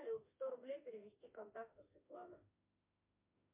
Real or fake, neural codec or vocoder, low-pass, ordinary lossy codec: fake; autoencoder, 48 kHz, 32 numbers a frame, DAC-VAE, trained on Japanese speech; 3.6 kHz; MP3, 32 kbps